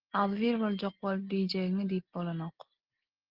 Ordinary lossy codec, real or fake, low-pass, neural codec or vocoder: Opus, 16 kbps; real; 5.4 kHz; none